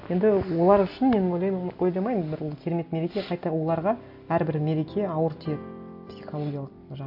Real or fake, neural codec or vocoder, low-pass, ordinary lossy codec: real; none; 5.4 kHz; AAC, 48 kbps